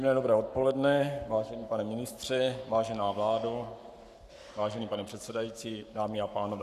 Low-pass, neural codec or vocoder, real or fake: 14.4 kHz; codec, 44.1 kHz, 7.8 kbps, Pupu-Codec; fake